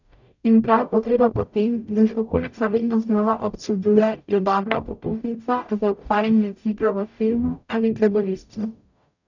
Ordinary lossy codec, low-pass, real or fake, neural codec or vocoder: none; 7.2 kHz; fake; codec, 44.1 kHz, 0.9 kbps, DAC